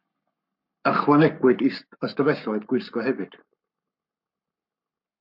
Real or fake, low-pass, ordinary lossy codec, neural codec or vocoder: fake; 5.4 kHz; MP3, 48 kbps; codec, 44.1 kHz, 7.8 kbps, Pupu-Codec